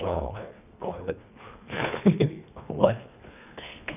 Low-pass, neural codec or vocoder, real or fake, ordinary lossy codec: 3.6 kHz; codec, 24 kHz, 1.5 kbps, HILCodec; fake; none